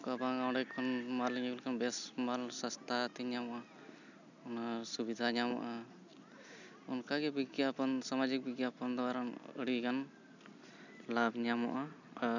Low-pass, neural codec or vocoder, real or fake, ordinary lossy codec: 7.2 kHz; none; real; none